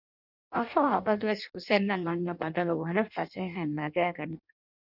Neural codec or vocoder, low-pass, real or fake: codec, 16 kHz in and 24 kHz out, 0.6 kbps, FireRedTTS-2 codec; 5.4 kHz; fake